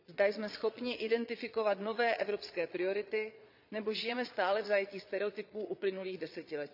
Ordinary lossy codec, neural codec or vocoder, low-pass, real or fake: none; vocoder, 22.05 kHz, 80 mel bands, Vocos; 5.4 kHz; fake